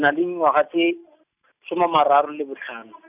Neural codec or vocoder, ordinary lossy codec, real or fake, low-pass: none; none; real; 3.6 kHz